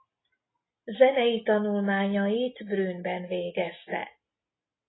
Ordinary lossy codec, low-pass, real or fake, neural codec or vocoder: AAC, 16 kbps; 7.2 kHz; real; none